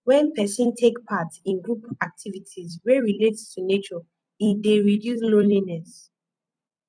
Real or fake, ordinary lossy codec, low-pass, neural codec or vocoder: fake; none; 9.9 kHz; vocoder, 44.1 kHz, 128 mel bands every 256 samples, BigVGAN v2